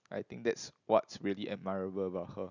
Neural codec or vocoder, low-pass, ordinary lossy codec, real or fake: none; 7.2 kHz; none; real